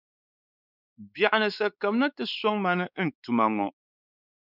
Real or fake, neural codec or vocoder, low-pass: fake; codec, 16 kHz, 4 kbps, X-Codec, WavLM features, trained on Multilingual LibriSpeech; 5.4 kHz